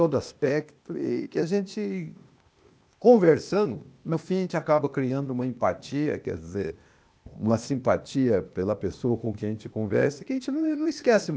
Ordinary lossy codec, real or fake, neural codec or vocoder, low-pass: none; fake; codec, 16 kHz, 0.8 kbps, ZipCodec; none